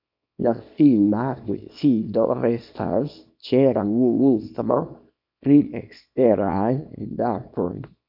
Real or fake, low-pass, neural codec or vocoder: fake; 5.4 kHz; codec, 24 kHz, 0.9 kbps, WavTokenizer, small release